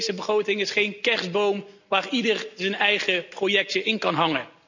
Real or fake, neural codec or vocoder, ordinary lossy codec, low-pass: real; none; none; 7.2 kHz